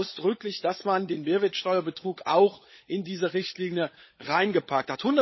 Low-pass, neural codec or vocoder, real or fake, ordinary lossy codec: 7.2 kHz; codec, 16 kHz, 16 kbps, FunCodec, trained on LibriTTS, 50 frames a second; fake; MP3, 24 kbps